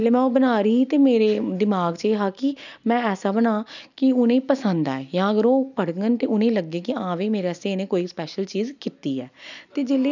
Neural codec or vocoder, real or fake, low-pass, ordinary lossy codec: none; real; 7.2 kHz; none